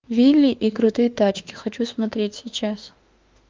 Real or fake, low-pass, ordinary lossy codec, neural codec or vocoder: fake; 7.2 kHz; Opus, 24 kbps; autoencoder, 48 kHz, 32 numbers a frame, DAC-VAE, trained on Japanese speech